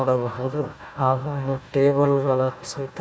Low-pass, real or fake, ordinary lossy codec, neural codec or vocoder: none; fake; none; codec, 16 kHz, 1 kbps, FunCodec, trained on Chinese and English, 50 frames a second